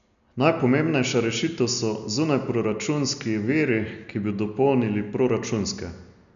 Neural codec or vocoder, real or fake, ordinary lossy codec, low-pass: none; real; none; 7.2 kHz